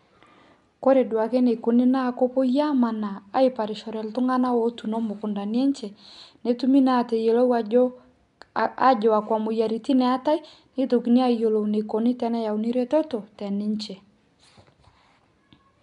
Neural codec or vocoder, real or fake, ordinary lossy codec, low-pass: none; real; none; 10.8 kHz